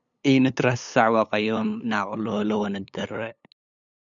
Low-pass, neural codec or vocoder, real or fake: 7.2 kHz; codec, 16 kHz, 8 kbps, FunCodec, trained on LibriTTS, 25 frames a second; fake